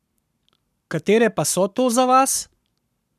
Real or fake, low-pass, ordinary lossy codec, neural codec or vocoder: fake; 14.4 kHz; none; codec, 44.1 kHz, 7.8 kbps, Pupu-Codec